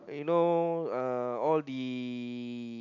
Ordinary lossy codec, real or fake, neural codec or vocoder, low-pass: AAC, 48 kbps; real; none; 7.2 kHz